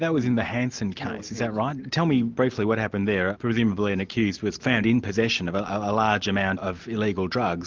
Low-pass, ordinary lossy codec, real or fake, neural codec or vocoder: 7.2 kHz; Opus, 24 kbps; real; none